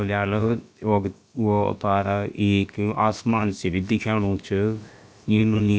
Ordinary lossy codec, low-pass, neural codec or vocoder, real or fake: none; none; codec, 16 kHz, about 1 kbps, DyCAST, with the encoder's durations; fake